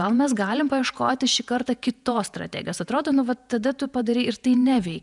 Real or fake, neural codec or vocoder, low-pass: fake; vocoder, 48 kHz, 128 mel bands, Vocos; 10.8 kHz